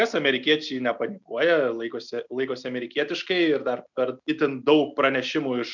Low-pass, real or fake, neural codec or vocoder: 7.2 kHz; real; none